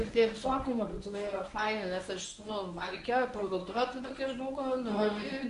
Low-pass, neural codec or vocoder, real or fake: 10.8 kHz; codec, 24 kHz, 0.9 kbps, WavTokenizer, medium speech release version 1; fake